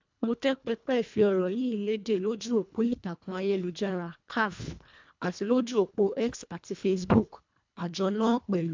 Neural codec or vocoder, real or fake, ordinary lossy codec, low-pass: codec, 24 kHz, 1.5 kbps, HILCodec; fake; MP3, 64 kbps; 7.2 kHz